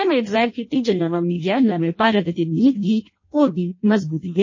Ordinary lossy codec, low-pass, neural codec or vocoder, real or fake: MP3, 32 kbps; 7.2 kHz; codec, 16 kHz in and 24 kHz out, 0.6 kbps, FireRedTTS-2 codec; fake